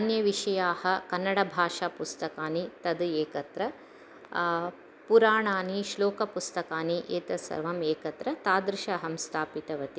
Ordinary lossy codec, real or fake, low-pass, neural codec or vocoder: none; real; none; none